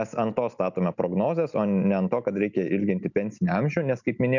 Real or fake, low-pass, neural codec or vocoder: real; 7.2 kHz; none